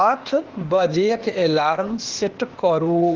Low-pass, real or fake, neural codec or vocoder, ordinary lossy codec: 7.2 kHz; fake; codec, 16 kHz, 0.8 kbps, ZipCodec; Opus, 16 kbps